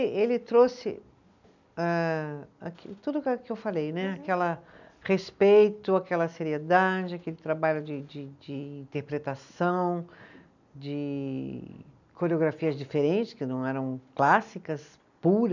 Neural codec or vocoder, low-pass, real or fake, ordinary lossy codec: none; 7.2 kHz; real; none